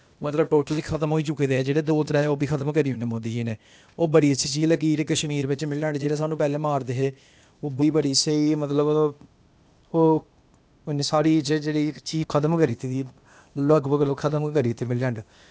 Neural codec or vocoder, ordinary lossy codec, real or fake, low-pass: codec, 16 kHz, 0.8 kbps, ZipCodec; none; fake; none